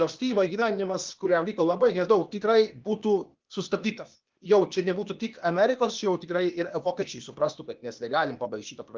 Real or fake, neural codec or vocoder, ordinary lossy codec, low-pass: fake; codec, 16 kHz, 0.8 kbps, ZipCodec; Opus, 32 kbps; 7.2 kHz